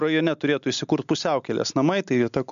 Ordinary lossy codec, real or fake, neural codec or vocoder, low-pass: MP3, 96 kbps; real; none; 7.2 kHz